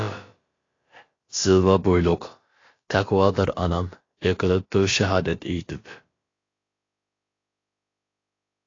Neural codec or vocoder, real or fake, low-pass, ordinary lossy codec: codec, 16 kHz, about 1 kbps, DyCAST, with the encoder's durations; fake; 7.2 kHz; AAC, 32 kbps